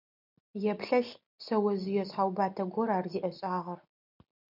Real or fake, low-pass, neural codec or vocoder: real; 5.4 kHz; none